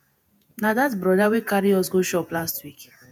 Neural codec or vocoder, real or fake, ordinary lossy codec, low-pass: vocoder, 48 kHz, 128 mel bands, Vocos; fake; none; none